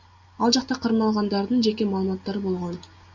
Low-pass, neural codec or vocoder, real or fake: 7.2 kHz; none; real